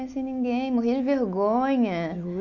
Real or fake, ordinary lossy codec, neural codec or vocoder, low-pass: real; none; none; 7.2 kHz